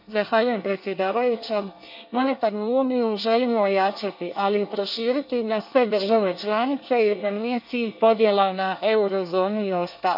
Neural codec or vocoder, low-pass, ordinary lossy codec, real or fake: codec, 24 kHz, 1 kbps, SNAC; 5.4 kHz; none; fake